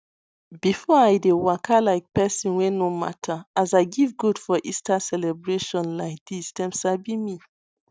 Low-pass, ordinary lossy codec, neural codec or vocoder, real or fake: none; none; none; real